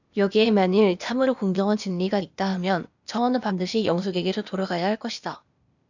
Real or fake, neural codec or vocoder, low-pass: fake; codec, 16 kHz, 0.8 kbps, ZipCodec; 7.2 kHz